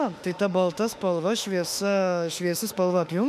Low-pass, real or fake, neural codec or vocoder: 14.4 kHz; fake; autoencoder, 48 kHz, 32 numbers a frame, DAC-VAE, trained on Japanese speech